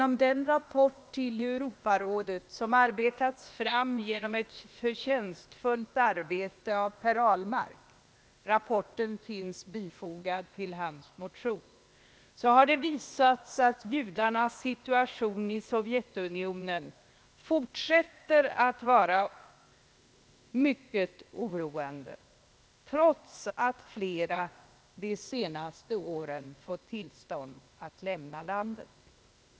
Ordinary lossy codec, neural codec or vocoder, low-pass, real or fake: none; codec, 16 kHz, 0.8 kbps, ZipCodec; none; fake